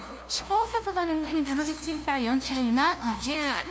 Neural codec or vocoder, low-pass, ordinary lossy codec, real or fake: codec, 16 kHz, 0.5 kbps, FunCodec, trained on LibriTTS, 25 frames a second; none; none; fake